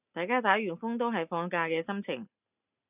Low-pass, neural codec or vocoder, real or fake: 3.6 kHz; none; real